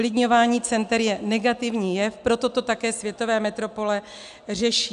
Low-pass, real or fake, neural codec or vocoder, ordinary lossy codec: 10.8 kHz; real; none; AAC, 96 kbps